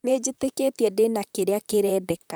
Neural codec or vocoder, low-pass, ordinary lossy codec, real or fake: vocoder, 44.1 kHz, 128 mel bands, Pupu-Vocoder; none; none; fake